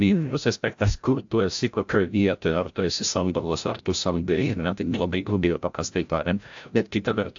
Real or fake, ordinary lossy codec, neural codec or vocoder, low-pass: fake; AAC, 64 kbps; codec, 16 kHz, 0.5 kbps, FreqCodec, larger model; 7.2 kHz